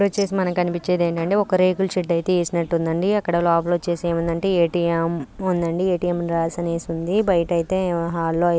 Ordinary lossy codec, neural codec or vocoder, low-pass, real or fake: none; none; none; real